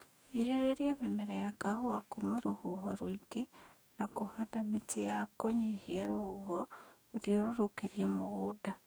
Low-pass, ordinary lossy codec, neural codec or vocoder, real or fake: none; none; codec, 44.1 kHz, 2.6 kbps, DAC; fake